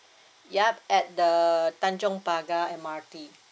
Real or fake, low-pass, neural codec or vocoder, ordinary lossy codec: real; none; none; none